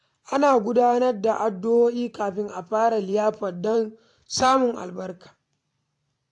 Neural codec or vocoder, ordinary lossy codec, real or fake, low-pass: vocoder, 44.1 kHz, 128 mel bands every 512 samples, BigVGAN v2; AAC, 64 kbps; fake; 10.8 kHz